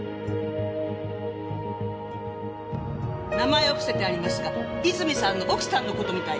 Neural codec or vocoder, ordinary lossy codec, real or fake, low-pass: none; none; real; none